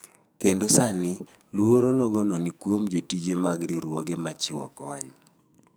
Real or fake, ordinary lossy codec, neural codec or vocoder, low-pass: fake; none; codec, 44.1 kHz, 2.6 kbps, SNAC; none